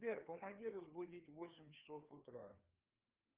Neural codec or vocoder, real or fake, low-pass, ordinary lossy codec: codec, 16 kHz, 2 kbps, FreqCodec, larger model; fake; 3.6 kHz; Opus, 24 kbps